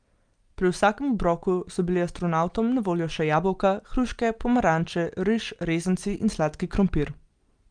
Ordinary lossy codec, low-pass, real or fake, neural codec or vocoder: Opus, 32 kbps; 9.9 kHz; real; none